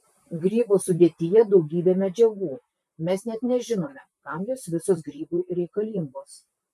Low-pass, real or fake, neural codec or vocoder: 14.4 kHz; fake; vocoder, 44.1 kHz, 128 mel bands, Pupu-Vocoder